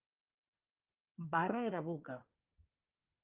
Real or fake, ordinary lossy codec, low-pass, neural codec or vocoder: fake; Opus, 24 kbps; 3.6 kHz; codec, 24 kHz, 1 kbps, SNAC